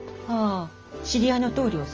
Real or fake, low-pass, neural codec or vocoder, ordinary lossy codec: real; 7.2 kHz; none; Opus, 24 kbps